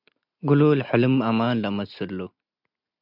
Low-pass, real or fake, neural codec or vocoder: 5.4 kHz; real; none